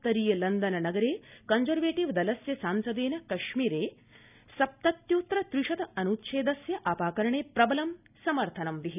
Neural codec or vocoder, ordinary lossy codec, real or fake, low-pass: none; none; real; 3.6 kHz